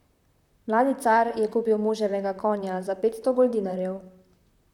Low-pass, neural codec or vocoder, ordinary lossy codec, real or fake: 19.8 kHz; vocoder, 44.1 kHz, 128 mel bands, Pupu-Vocoder; none; fake